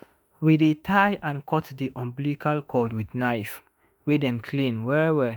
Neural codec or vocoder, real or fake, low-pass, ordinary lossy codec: autoencoder, 48 kHz, 32 numbers a frame, DAC-VAE, trained on Japanese speech; fake; none; none